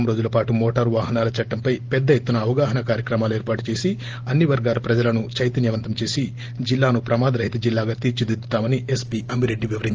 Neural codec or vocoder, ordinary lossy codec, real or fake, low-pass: none; Opus, 16 kbps; real; 7.2 kHz